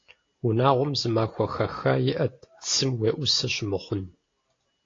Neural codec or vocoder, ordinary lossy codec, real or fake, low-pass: none; AAC, 32 kbps; real; 7.2 kHz